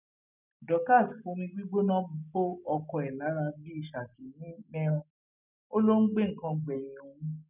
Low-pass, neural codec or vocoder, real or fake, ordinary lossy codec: 3.6 kHz; none; real; none